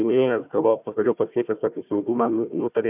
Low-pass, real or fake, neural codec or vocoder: 3.6 kHz; fake; codec, 16 kHz, 1 kbps, FunCodec, trained on Chinese and English, 50 frames a second